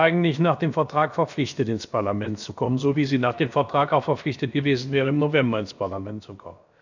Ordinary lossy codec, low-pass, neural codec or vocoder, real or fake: none; 7.2 kHz; codec, 16 kHz, about 1 kbps, DyCAST, with the encoder's durations; fake